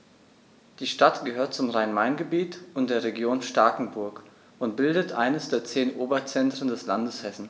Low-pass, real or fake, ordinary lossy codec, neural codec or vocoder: none; real; none; none